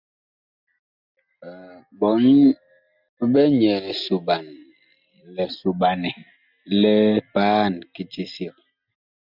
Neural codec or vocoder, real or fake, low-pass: none; real; 5.4 kHz